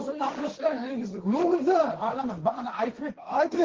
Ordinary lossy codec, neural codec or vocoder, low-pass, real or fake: Opus, 16 kbps; codec, 16 kHz, 1.1 kbps, Voila-Tokenizer; 7.2 kHz; fake